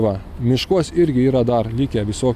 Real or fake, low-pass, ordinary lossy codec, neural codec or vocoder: real; 14.4 kHz; AAC, 96 kbps; none